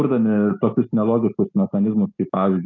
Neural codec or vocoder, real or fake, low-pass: none; real; 7.2 kHz